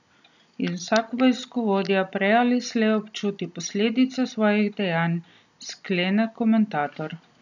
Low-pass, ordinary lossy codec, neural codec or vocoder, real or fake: 7.2 kHz; none; none; real